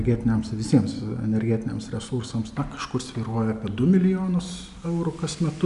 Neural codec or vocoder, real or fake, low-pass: none; real; 10.8 kHz